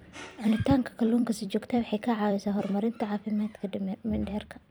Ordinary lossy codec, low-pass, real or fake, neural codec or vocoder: none; none; fake; vocoder, 44.1 kHz, 128 mel bands every 256 samples, BigVGAN v2